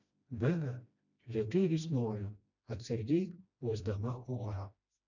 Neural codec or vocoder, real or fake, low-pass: codec, 16 kHz, 1 kbps, FreqCodec, smaller model; fake; 7.2 kHz